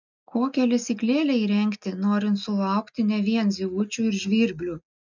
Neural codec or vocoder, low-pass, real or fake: none; 7.2 kHz; real